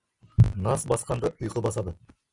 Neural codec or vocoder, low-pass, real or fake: none; 10.8 kHz; real